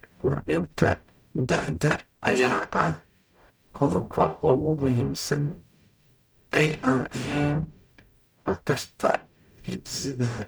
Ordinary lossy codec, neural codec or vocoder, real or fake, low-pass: none; codec, 44.1 kHz, 0.9 kbps, DAC; fake; none